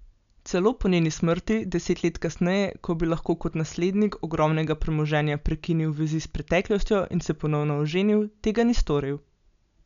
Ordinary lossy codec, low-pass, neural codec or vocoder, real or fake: none; 7.2 kHz; none; real